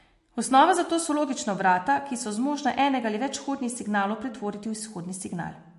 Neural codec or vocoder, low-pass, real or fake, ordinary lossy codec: none; 14.4 kHz; real; MP3, 48 kbps